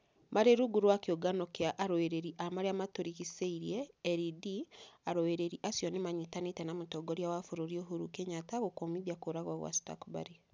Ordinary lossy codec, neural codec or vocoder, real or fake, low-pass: none; none; real; none